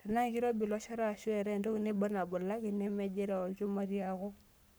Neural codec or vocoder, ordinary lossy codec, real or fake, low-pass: codec, 44.1 kHz, 7.8 kbps, Pupu-Codec; none; fake; none